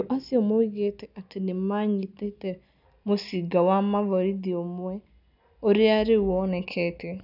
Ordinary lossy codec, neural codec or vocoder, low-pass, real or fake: none; none; 5.4 kHz; real